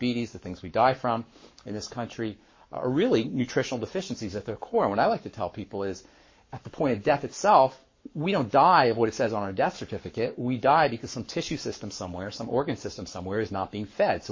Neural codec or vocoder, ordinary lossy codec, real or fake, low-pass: codec, 44.1 kHz, 7.8 kbps, Pupu-Codec; MP3, 32 kbps; fake; 7.2 kHz